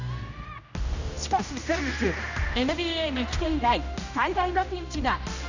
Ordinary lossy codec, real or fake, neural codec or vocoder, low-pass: none; fake; codec, 16 kHz, 1 kbps, X-Codec, HuBERT features, trained on general audio; 7.2 kHz